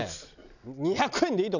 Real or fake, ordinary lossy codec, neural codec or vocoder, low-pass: real; none; none; 7.2 kHz